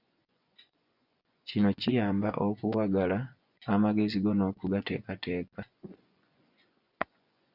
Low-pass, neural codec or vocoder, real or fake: 5.4 kHz; vocoder, 24 kHz, 100 mel bands, Vocos; fake